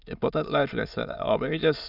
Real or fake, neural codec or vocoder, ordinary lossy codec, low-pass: fake; autoencoder, 22.05 kHz, a latent of 192 numbers a frame, VITS, trained on many speakers; none; 5.4 kHz